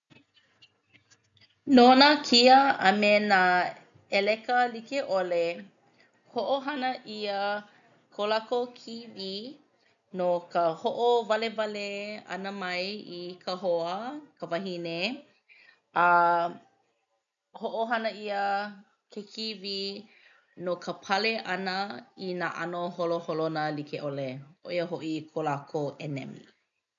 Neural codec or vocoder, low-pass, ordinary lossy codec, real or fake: none; 7.2 kHz; none; real